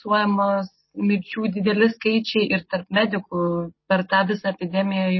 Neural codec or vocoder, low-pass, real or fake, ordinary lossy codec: none; 7.2 kHz; real; MP3, 24 kbps